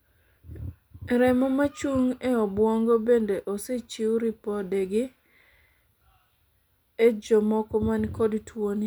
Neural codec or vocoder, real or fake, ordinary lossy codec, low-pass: none; real; none; none